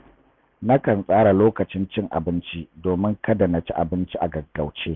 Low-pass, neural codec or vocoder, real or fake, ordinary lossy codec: none; none; real; none